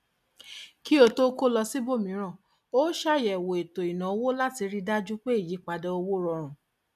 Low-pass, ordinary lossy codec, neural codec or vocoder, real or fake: 14.4 kHz; none; none; real